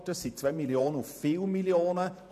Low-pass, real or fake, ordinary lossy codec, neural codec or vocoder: 14.4 kHz; fake; none; vocoder, 48 kHz, 128 mel bands, Vocos